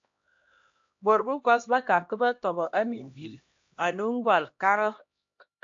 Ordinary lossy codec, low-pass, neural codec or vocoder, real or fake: AAC, 48 kbps; 7.2 kHz; codec, 16 kHz, 1 kbps, X-Codec, HuBERT features, trained on LibriSpeech; fake